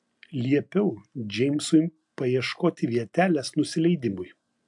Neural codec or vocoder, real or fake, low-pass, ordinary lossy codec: none; real; 10.8 kHz; AAC, 64 kbps